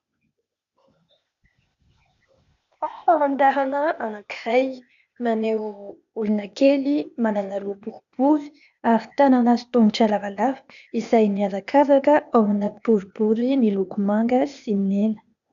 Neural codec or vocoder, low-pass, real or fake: codec, 16 kHz, 0.8 kbps, ZipCodec; 7.2 kHz; fake